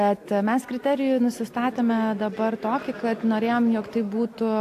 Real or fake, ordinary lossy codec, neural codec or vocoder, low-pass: real; AAC, 64 kbps; none; 14.4 kHz